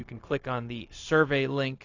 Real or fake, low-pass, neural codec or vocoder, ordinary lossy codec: fake; 7.2 kHz; codec, 16 kHz, 0.4 kbps, LongCat-Audio-Codec; AAC, 48 kbps